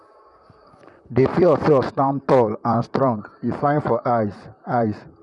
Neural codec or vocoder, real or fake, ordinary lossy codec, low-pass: vocoder, 44.1 kHz, 128 mel bands, Pupu-Vocoder; fake; none; 10.8 kHz